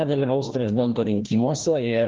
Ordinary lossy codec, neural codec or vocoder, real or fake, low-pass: Opus, 16 kbps; codec, 16 kHz, 1 kbps, FreqCodec, larger model; fake; 7.2 kHz